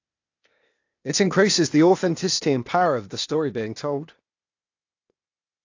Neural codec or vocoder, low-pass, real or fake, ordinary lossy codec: codec, 16 kHz, 0.8 kbps, ZipCodec; 7.2 kHz; fake; AAC, 48 kbps